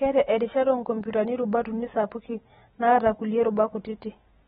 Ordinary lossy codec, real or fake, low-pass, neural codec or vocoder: AAC, 16 kbps; real; 19.8 kHz; none